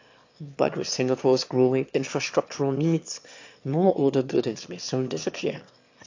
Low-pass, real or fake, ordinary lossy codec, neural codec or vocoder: 7.2 kHz; fake; AAC, 48 kbps; autoencoder, 22.05 kHz, a latent of 192 numbers a frame, VITS, trained on one speaker